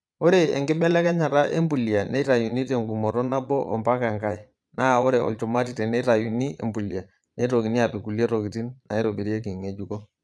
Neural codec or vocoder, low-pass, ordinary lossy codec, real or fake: vocoder, 22.05 kHz, 80 mel bands, Vocos; none; none; fake